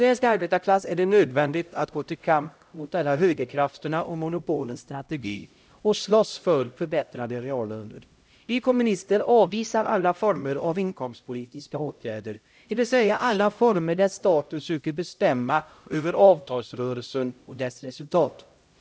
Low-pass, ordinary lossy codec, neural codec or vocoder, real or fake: none; none; codec, 16 kHz, 0.5 kbps, X-Codec, HuBERT features, trained on LibriSpeech; fake